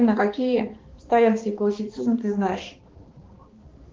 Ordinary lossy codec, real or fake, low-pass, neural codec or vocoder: Opus, 16 kbps; fake; 7.2 kHz; codec, 16 kHz, 2 kbps, X-Codec, HuBERT features, trained on balanced general audio